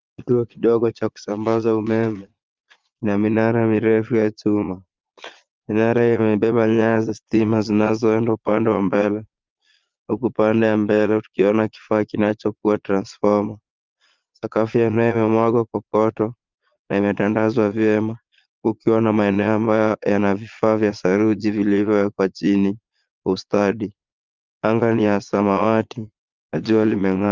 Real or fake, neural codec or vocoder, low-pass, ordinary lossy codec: fake; vocoder, 22.05 kHz, 80 mel bands, Vocos; 7.2 kHz; Opus, 24 kbps